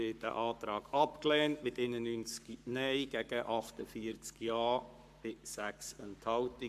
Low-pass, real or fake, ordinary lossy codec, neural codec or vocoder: 14.4 kHz; fake; MP3, 96 kbps; codec, 44.1 kHz, 7.8 kbps, Pupu-Codec